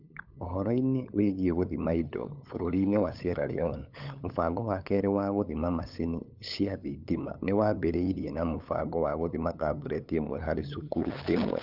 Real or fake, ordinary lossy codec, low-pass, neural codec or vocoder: fake; none; 5.4 kHz; codec, 16 kHz, 8 kbps, FunCodec, trained on LibriTTS, 25 frames a second